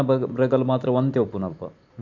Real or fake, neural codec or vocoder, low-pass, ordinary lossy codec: real; none; 7.2 kHz; none